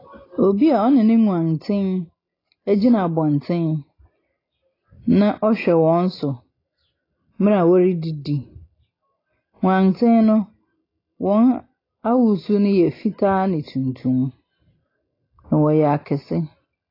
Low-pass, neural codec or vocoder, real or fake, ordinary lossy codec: 5.4 kHz; none; real; AAC, 24 kbps